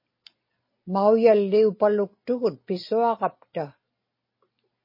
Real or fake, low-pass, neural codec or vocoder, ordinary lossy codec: real; 5.4 kHz; none; MP3, 24 kbps